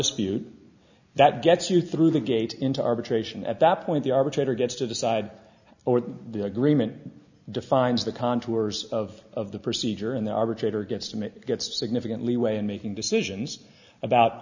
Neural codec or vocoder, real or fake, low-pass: none; real; 7.2 kHz